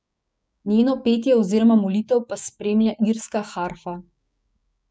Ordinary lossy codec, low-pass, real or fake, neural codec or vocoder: none; none; fake; codec, 16 kHz, 6 kbps, DAC